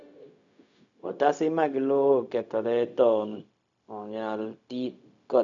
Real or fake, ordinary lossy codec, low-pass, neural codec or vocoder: fake; none; 7.2 kHz; codec, 16 kHz, 0.4 kbps, LongCat-Audio-Codec